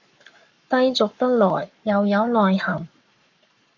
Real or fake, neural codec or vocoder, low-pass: fake; codec, 44.1 kHz, 7.8 kbps, Pupu-Codec; 7.2 kHz